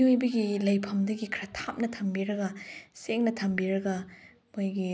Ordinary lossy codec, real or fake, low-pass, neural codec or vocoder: none; real; none; none